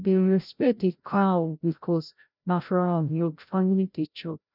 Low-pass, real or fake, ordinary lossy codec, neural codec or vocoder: 5.4 kHz; fake; none; codec, 16 kHz, 0.5 kbps, FreqCodec, larger model